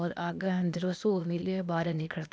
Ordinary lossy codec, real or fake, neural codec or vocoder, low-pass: none; fake; codec, 16 kHz, 0.8 kbps, ZipCodec; none